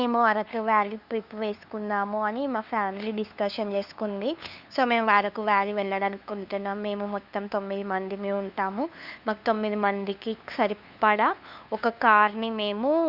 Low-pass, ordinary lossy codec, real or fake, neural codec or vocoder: 5.4 kHz; Opus, 64 kbps; fake; codec, 16 kHz, 2 kbps, FunCodec, trained on LibriTTS, 25 frames a second